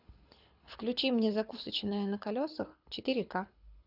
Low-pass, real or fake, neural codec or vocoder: 5.4 kHz; fake; codec, 24 kHz, 6 kbps, HILCodec